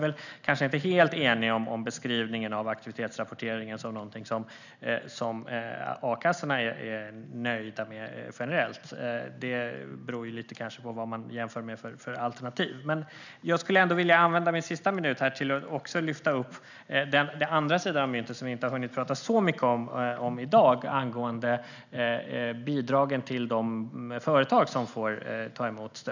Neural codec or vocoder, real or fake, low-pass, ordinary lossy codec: none; real; 7.2 kHz; none